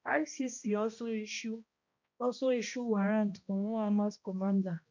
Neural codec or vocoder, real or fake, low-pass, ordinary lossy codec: codec, 16 kHz, 1 kbps, X-Codec, HuBERT features, trained on balanced general audio; fake; 7.2 kHz; MP3, 64 kbps